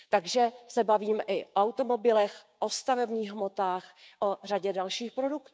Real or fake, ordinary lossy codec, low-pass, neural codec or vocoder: fake; none; none; codec, 16 kHz, 6 kbps, DAC